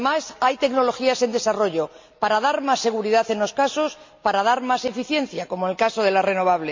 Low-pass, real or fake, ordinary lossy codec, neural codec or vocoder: 7.2 kHz; real; MP3, 48 kbps; none